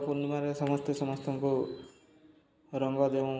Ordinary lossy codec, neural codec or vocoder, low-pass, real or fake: none; none; none; real